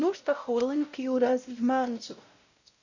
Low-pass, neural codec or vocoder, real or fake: 7.2 kHz; codec, 16 kHz, 0.5 kbps, X-Codec, WavLM features, trained on Multilingual LibriSpeech; fake